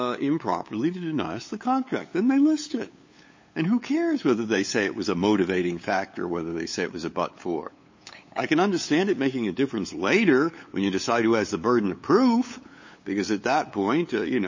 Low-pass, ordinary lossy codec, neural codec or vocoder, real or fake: 7.2 kHz; MP3, 32 kbps; codec, 16 kHz, 8 kbps, FunCodec, trained on LibriTTS, 25 frames a second; fake